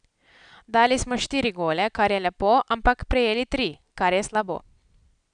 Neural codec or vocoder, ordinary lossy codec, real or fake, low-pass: none; none; real; 9.9 kHz